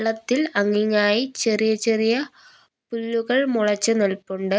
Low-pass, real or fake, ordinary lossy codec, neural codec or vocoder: none; real; none; none